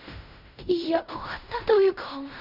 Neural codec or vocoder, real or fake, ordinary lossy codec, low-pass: codec, 16 kHz in and 24 kHz out, 0.4 kbps, LongCat-Audio-Codec, fine tuned four codebook decoder; fake; none; 5.4 kHz